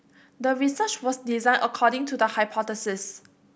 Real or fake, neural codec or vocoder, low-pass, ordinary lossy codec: real; none; none; none